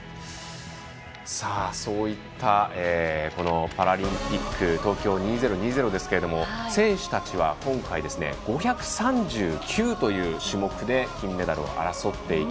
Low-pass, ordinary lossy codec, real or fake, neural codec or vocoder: none; none; real; none